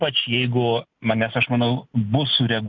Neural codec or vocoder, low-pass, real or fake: none; 7.2 kHz; real